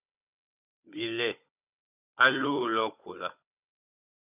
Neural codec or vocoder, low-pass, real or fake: codec, 16 kHz, 16 kbps, FunCodec, trained on Chinese and English, 50 frames a second; 3.6 kHz; fake